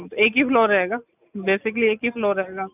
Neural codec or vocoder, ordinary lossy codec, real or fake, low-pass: none; none; real; 3.6 kHz